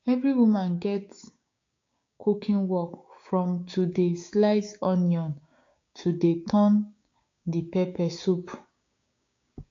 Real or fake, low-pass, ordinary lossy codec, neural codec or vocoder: fake; 7.2 kHz; AAC, 48 kbps; codec, 16 kHz, 6 kbps, DAC